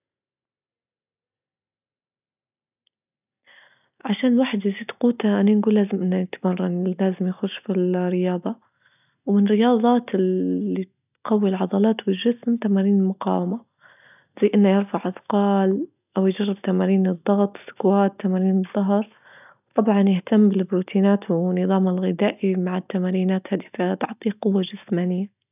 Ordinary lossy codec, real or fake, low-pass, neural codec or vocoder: none; real; 3.6 kHz; none